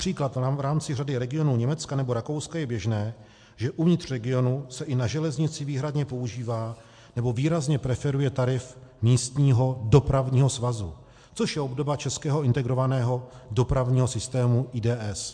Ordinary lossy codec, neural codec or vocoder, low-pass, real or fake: MP3, 64 kbps; none; 9.9 kHz; real